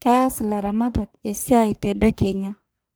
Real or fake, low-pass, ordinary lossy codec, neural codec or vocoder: fake; none; none; codec, 44.1 kHz, 1.7 kbps, Pupu-Codec